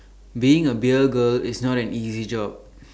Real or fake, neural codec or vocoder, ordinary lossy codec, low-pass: real; none; none; none